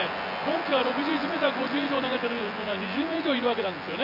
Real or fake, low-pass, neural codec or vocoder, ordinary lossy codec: fake; 5.4 kHz; vocoder, 24 kHz, 100 mel bands, Vocos; none